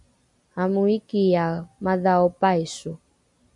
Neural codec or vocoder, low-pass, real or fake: none; 10.8 kHz; real